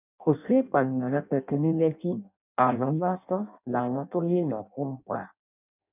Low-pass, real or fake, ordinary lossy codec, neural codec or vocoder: 3.6 kHz; fake; none; codec, 16 kHz in and 24 kHz out, 0.6 kbps, FireRedTTS-2 codec